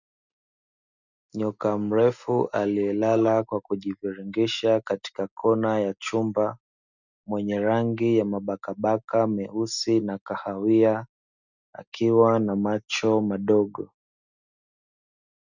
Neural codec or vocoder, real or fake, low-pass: none; real; 7.2 kHz